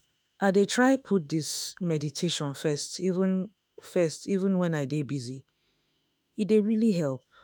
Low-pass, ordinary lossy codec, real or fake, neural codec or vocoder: none; none; fake; autoencoder, 48 kHz, 32 numbers a frame, DAC-VAE, trained on Japanese speech